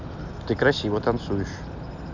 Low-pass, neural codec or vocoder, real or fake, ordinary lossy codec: 7.2 kHz; none; real; none